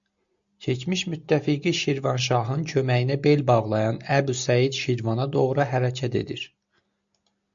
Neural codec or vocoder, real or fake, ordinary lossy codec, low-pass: none; real; MP3, 64 kbps; 7.2 kHz